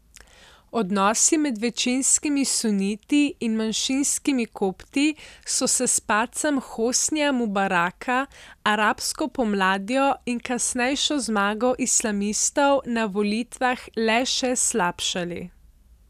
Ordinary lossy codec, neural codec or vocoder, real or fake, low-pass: none; none; real; 14.4 kHz